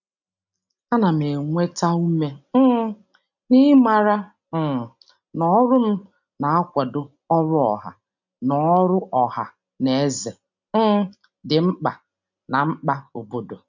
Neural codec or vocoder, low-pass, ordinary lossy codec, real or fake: none; 7.2 kHz; none; real